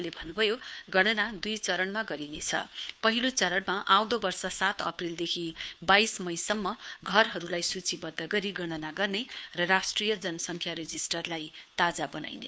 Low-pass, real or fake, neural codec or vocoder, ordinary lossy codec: none; fake; codec, 16 kHz, 2 kbps, FunCodec, trained on Chinese and English, 25 frames a second; none